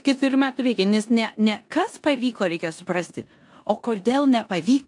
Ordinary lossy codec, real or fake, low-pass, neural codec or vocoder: AAC, 64 kbps; fake; 10.8 kHz; codec, 16 kHz in and 24 kHz out, 0.9 kbps, LongCat-Audio-Codec, four codebook decoder